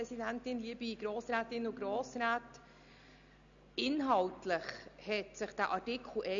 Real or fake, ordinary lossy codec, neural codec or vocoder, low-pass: real; none; none; 7.2 kHz